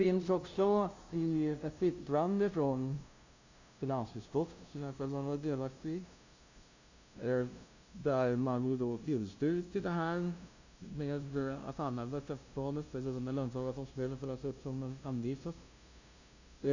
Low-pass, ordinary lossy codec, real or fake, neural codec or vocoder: 7.2 kHz; Opus, 64 kbps; fake; codec, 16 kHz, 0.5 kbps, FunCodec, trained on LibriTTS, 25 frames a second